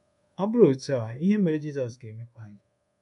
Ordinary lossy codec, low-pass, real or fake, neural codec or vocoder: none; 10.8 kHz; fake; codec, 24 kHz, 1.2 kbps, DualCodec